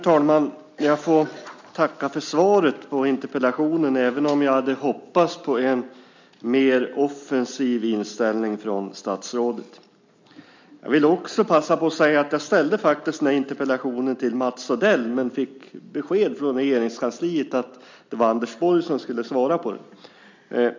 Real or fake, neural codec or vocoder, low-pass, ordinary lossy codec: real; none; 7.2 kHz; MP3, 64 kbps